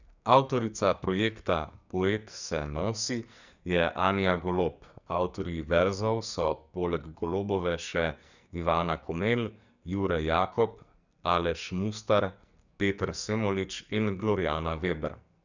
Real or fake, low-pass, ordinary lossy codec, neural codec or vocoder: fake; 7.2 kHz; none; codec, 44.1 kHz, 2.6 kbps, SNAC